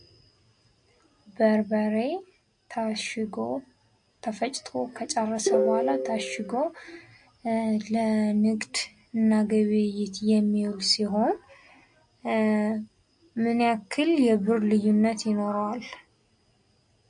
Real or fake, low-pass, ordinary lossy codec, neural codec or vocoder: real; 9.9 kHz; MP3, 48 kbps; none